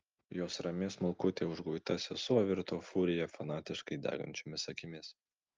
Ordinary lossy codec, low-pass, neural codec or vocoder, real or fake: Opus, 24 kbps; 7.2 kHz; none; real